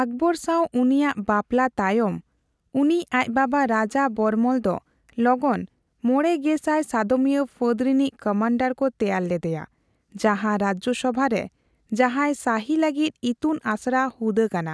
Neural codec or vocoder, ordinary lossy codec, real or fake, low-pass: none; none; real; none